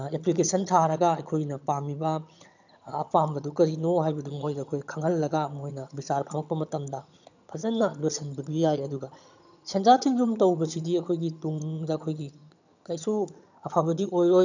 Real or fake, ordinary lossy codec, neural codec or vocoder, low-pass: fake; none; vocoder, 22.05 kHz, 80 mel bands, HiFi-GAN; 7.2 kHz